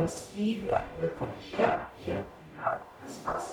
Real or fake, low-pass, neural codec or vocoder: fake; 19.8 kHz; codec, 44.1 kHz, 0.9 kbps, DAC